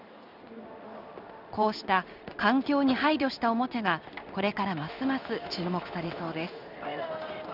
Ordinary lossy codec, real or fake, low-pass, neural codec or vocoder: none; fake; 5.4 kHz; codec, 16 kHz in and 24 kHz out, 1 kbps, XY-Tokenizer